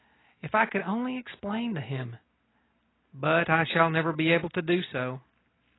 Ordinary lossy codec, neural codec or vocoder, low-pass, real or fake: AAC, 16 kbps; none; 7.2 kHz; real